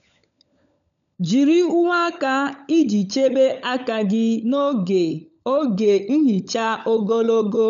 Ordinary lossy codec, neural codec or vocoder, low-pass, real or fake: none; codec, 16 kHz, 16 kbps, FunCodec, trained on LibriTTS, 50 frames a second; 7.2 kHz; fake